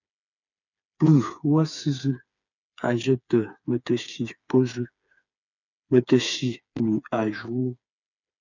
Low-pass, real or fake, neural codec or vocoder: 7.2 kHz; fake; codec, 16 kHz, 4 kbps, FreqCodec, smaller model